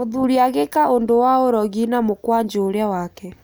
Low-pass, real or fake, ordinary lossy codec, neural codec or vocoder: none; real; none; none